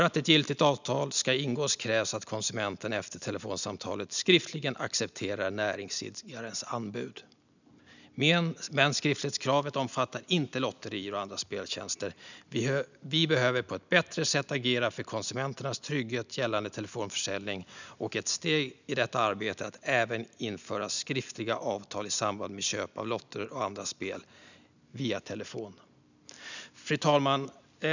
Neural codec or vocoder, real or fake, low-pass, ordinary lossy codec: none; real; 7.2 kHz; none